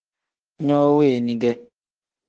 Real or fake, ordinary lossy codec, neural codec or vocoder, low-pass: fake; Opus, 16 kbps; autoencoder, 48 kHz, 32 numbers a frame, DAC-VAE, trained on Japanese speech; 9.9 kHz